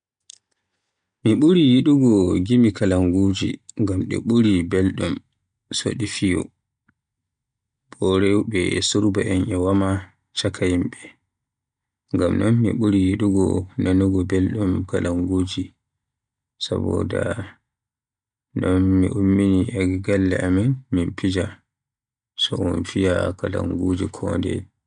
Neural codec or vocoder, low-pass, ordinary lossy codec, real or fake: none; 9.9 kHz; MP3, 64 kbps; real